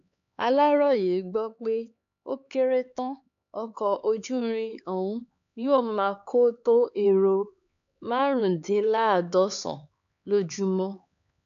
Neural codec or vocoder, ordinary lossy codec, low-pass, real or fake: codec, 16 kHz, 4 kbps, X-Codec, HuBERT features, trained on LibriSpeech; none; 7.2 kHz; fake